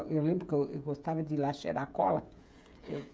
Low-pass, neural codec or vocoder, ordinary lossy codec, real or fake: none; codec, 16 kHz, 16 kbps, FreqCodec, smaller model; none; fake